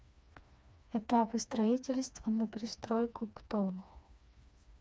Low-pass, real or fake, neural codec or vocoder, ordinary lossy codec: none; fake; codec, 16 kHz, 2 kbps, FreqCodec, smaller model; none